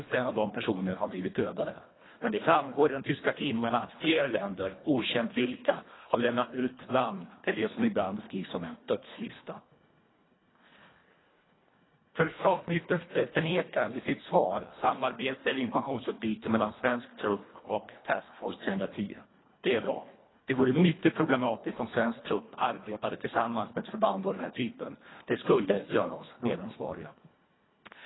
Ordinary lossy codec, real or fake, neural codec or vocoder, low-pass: AAC, 16 kbps; fake; codec, 24 kHz, 1.5 kbps, HILCodec; 7.2 kHz